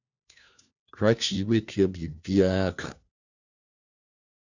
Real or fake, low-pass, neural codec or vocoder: fake; 7.2 kHz; codec, 16 kHz, 1 kbps, FunCodec, trained on LibriTTS, 50 frames a second